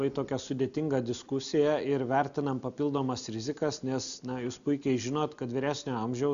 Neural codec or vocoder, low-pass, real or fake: none; 7.2 kHz; real